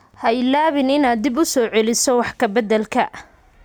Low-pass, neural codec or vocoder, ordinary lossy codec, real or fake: none; none; none; real